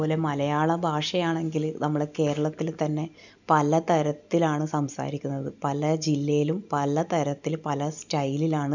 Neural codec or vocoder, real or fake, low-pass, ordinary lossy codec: none; real; 7.2 kHz; none